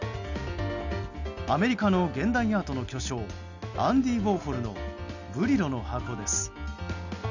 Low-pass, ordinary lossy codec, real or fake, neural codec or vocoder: 7.2 kHz; none; real; none